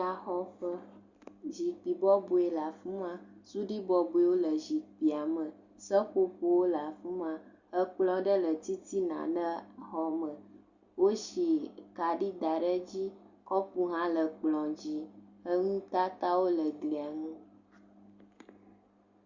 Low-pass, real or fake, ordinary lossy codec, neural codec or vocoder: 7.2 kHz; real; Opus, 64 kbps; none